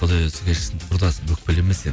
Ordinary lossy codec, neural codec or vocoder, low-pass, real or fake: none; none; none; real